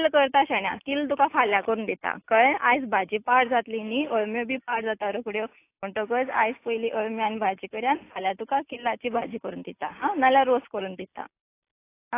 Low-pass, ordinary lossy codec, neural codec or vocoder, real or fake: 3.6 kHz; AAC, 24 kbps; none; real